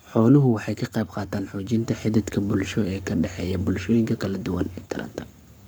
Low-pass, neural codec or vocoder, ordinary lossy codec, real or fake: none; codec, 44.1 kHz, 7.8 kbps, Pupu-Codec; none; fake